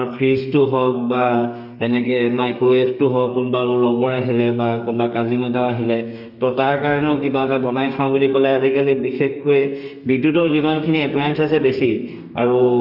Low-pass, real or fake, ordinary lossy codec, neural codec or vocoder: 5.4 kHz; fake; none; codec, 32 kHz, 1.9 kbps, SNAC